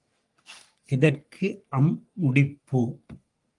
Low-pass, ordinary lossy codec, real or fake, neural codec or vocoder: 10.8 kHz; Opus, 32 kbps; fake; codec, 44.1 kHz, 3.4 kbps, Pupu-Codec